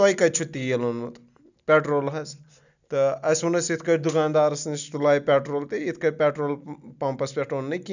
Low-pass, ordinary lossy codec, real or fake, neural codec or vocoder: 7.2 kHz; none; real; none